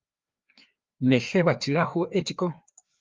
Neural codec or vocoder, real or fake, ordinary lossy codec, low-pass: codec, 16 kHz, 2 kbps, FreqCodec, larger model; fake; Opus, 32 kbps; 7.2 kHz